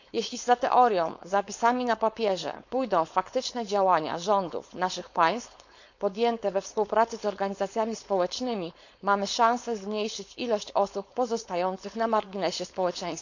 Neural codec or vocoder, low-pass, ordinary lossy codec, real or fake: codec, 16 kHz, 4.8 kbps, FACodec; 7.2 kHz; none; fake